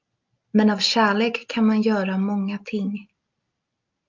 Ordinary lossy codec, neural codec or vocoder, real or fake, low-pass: Opus, 24 kbps; none; real; 7.2 kHz